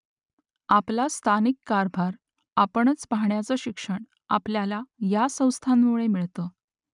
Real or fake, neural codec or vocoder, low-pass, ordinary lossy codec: real; none; 10.8 kHz; none